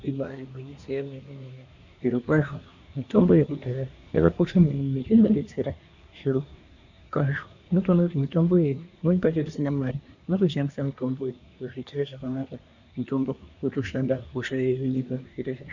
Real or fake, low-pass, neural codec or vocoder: fake; 7.2 kHz; codec, 24 kHz, 1 kbps, SNAC